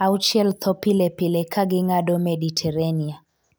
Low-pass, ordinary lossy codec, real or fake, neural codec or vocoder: none; none; real; none